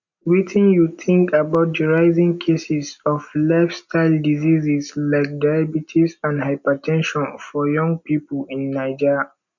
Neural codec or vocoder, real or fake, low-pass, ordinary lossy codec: none; real; 7.2 kHz; none